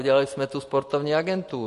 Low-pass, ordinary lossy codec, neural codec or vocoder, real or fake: 14.4 kHz; MP3, 48 kbps; none; real